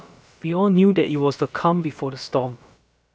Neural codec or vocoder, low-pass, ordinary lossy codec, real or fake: codec, 16 kHz, about 1 kbps, DyCAST, with the encoder's durations; none; none; fake